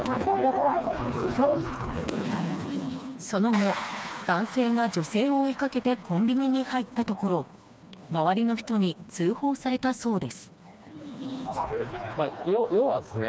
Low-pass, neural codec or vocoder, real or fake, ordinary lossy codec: none; codec, 16 kHz, 2 kbps, FreqCodec, smaller model; fake; none